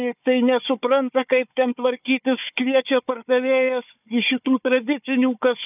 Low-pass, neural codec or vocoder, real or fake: 3.6 kHz; codec, 16 kHz, 4 kbps, FunCodec, trained on Chinese and English, 50 frames a second; fake